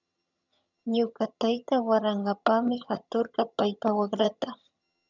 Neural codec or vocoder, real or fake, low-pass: vocoder, 22.05 kHz, 80 mel bands, HiFi-GAN; fake; 7.2 kHz